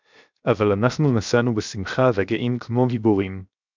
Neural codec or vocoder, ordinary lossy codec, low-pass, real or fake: codec, 16 kHz, 0.7 kbps, FocalCodec; MP3, 64 kbps; 7.2 kHz; fake